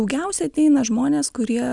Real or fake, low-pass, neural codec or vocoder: real; 10.8 kHz; none